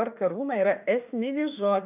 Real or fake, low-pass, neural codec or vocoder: fake; 3.6 kHz; autoencoder, 48 kHz, 32 numbers a frame, DAC-VAE, trained on Japanese speech